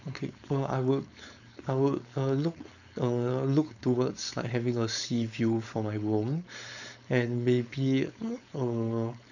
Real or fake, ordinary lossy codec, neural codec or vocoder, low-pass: fake; none; codec, 16 kHz, 4.8 kbps, FACodec; 7.2 kHz